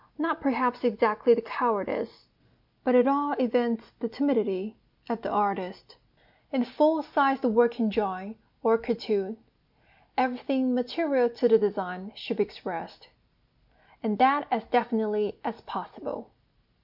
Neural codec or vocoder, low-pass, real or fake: none; 5.4 kHz; real